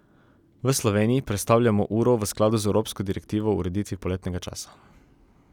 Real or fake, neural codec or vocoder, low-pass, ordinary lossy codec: real; none; 19.8 kHz; none